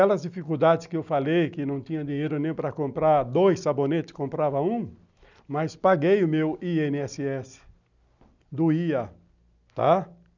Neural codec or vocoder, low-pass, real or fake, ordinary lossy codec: none; 7.2 kHz; real; none